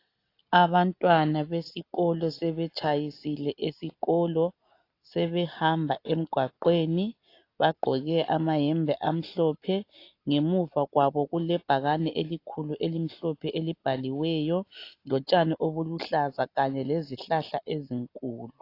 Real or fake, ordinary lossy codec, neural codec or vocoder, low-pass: real; AAC, 32 kbps; none; 5.4 kHz